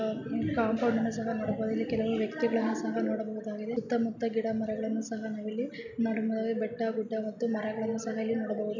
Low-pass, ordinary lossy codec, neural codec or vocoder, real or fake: 7.2 kHz; none; none; real